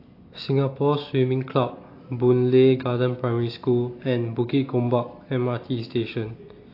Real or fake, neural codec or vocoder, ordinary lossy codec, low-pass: real; none; AAC, 32 kbps; 5.4 kHz